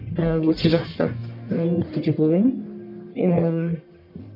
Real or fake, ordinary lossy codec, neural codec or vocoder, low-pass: fake; none; codec, 44.1 kHz, 1.7 kbps, Pupu-Codec; 5.4 kHz